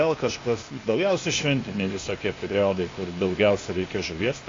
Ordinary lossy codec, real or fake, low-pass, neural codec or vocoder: AAC, 32 kbps; fake; 7.2 kHz; codec, 16 kHz, 0.8 kbps, ZipCodec